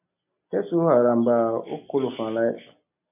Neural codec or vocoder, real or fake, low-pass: none; real; 3.6 kHz